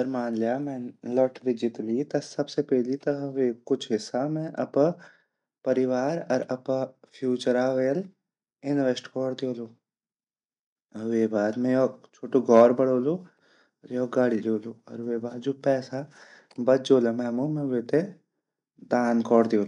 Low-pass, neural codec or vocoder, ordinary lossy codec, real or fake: 10.8 kHz; none; none; real